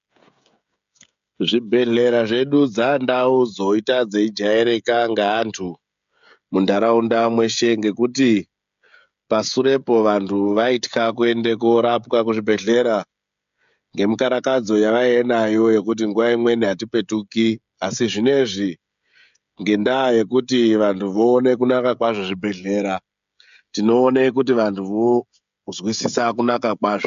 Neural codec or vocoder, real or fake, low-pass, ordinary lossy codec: codec, 16 kHz, 16 kbps, FreqCodec, smaller model; fake; 7.2 kHz; MP3, 64 kbps